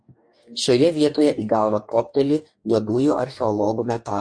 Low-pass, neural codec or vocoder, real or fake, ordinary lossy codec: 9.9 kHz; codec, 44.1 kHz, 2.6 kbps, DAC; fake; MP3, 48 kbps